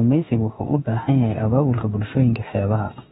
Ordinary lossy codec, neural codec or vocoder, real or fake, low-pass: AAC, 16 kbps; autoencoder, 48 kHz, 32 numbers a frame, DAC-VAE, trained on Japanese speech; fake; 19.8 kHz